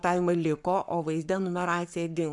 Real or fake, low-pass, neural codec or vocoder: fake; 10.8 kHz; codec, 44.1 kHz, 7.8 kbps, Pupu-Codec